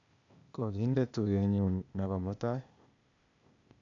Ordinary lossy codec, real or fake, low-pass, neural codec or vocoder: none; fake; 7.2 kHz; codec, 16 kHz, 0.8 kbps, ZipCodec